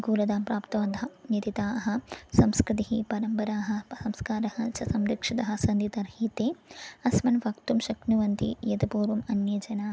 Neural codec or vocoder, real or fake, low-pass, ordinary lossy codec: none; real; none; none